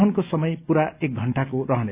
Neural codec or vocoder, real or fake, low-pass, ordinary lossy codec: none; real; 3.6 kHz; Opus, 64 kbps